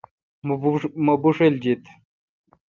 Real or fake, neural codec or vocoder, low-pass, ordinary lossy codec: real; none; 7.2 kHz; Opus, 24 kbps